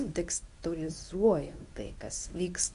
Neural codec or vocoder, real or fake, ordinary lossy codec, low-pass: codec, 24 kHz, 0.9 kbps, WavTokenizer, medium speech release version 1; fake; AAC, 96 kbps; 10.8 kHz